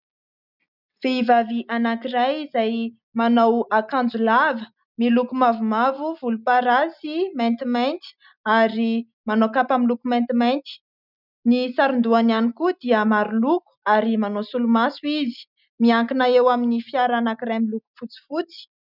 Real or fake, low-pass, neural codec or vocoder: real; 5.4 kHz; none